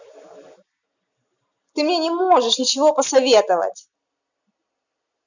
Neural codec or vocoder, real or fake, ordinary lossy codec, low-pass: none; real; none; 7.2 kHz